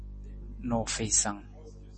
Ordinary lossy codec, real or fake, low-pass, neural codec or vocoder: MP3, 32 kbps; real; 10.8 kHz; none